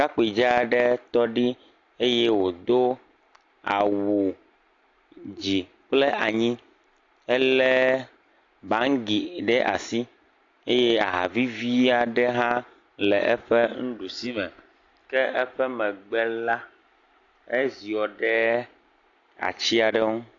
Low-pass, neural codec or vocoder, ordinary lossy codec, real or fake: 7.2 kHz; none; AAC, 48 kbps; real